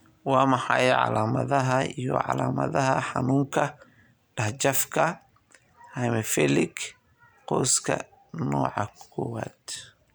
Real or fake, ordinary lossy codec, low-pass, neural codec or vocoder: real; none; none; none